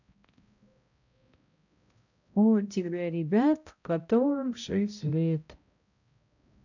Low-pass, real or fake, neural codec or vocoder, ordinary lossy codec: 7.2 kHz; fake; codec, 16 kHz, 0.5 kbps, X-Codec, HuBERT features, trained on balanced general audio; none